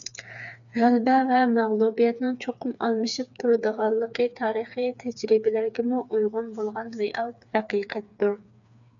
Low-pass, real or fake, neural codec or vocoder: 7.2 kHz; fake; codec, 16 kHz, 4 kbps, FreqCodec, smaller model